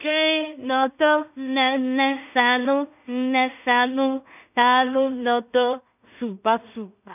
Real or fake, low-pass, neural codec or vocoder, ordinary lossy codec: fake; 3.6 kHz; codec, 16 kHz in and 24 kHz out, 0.4 kbps, LongCat-Audio-Codec, two codebook decoder; none